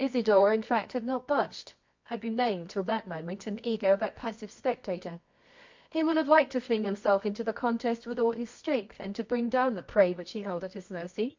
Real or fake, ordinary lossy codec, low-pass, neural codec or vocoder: fake; MP3, 48 kbps; 7.2 kHz; codec, 24 kHz, 0.9 kbps, WavTokenizer, medium music audio release